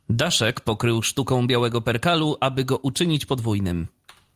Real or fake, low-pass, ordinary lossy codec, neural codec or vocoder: fake; 14.4 kHz; Opus, 32 kbps; vocoder, 44.1 kHz, 128 mel bands every 256 samples, BigVGAN v2